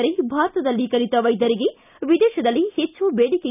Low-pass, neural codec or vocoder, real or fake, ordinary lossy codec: 3.6 kHz; none; real; none